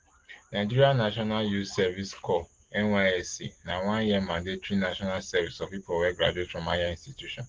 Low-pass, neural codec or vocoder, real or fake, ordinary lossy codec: 7.2 kHz; none; real; Opus, 16 kbps